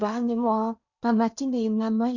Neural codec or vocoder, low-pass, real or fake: codec, 16 kHz in and 24 kHz out, 0.8 kbps, FocalCodec, streaming, 65536 codes; 7.2 kHz; fake